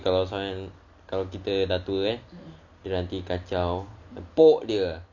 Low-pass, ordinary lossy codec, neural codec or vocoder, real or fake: 7.2 kHz; none; none; real